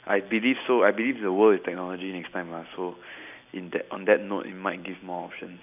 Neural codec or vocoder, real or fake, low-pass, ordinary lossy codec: none; real; 3.6 kHz; none